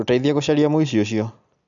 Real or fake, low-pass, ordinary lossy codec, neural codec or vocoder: real; 7.2 kHz; MP3, 96 kbps; none